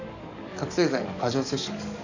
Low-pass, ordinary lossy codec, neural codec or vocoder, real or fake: 7.2 kHz; none; codec, 44.1 kHz, 7.8 kbps, DAC; fake